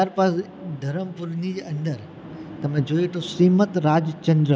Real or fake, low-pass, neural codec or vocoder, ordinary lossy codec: real; none; none; none